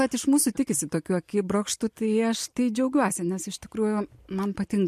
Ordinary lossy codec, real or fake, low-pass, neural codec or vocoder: MP3, 64 kbps; real; 14.4 kHz; none